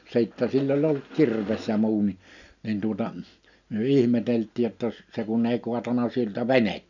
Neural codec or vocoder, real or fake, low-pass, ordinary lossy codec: none; real; 7.2 kHz; none